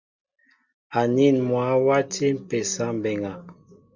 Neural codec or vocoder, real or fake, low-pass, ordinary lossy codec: none; real; 7.2 kHz; Opus, 64 kbps